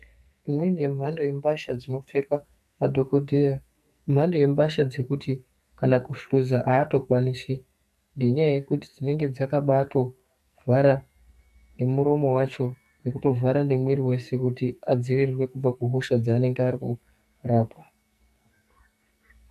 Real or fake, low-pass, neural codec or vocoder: fake; 14.4 kHz; codec, 44.1 kHz, 2.6 kbps, SNAC